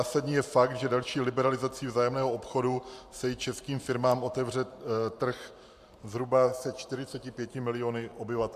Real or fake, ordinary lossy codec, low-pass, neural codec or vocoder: fake; MP3, 96 kbps; 14.4 kHz; vocoder, 44.1 kHz, 128 mel bands every 512 samples, BigVGAN v2